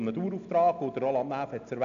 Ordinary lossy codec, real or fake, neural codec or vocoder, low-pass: none; real; none; 7.2 kHz